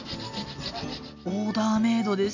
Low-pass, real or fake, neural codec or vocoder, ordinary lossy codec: 7.2 kHz; real; none; AAC, 48 kbps